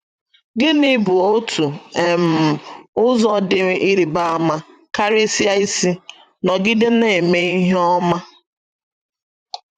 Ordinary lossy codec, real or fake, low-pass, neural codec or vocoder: AAC, 96 kbps; fake; 14.4 kHz; vocoder, 44.1 kHz, 128 mel bands, Pupu-Vocoder